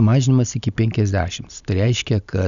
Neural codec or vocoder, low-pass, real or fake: none; 7.2 kHz; real